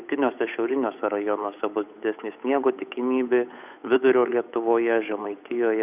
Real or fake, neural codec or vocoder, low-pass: fake; codec, 16 kHz, 8 kbps, FunCodec, trained on Chinese and English, 25 frames a second; 3.6 kHz